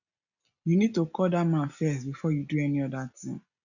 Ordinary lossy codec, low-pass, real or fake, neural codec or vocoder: none; 7.2 kHz; real; none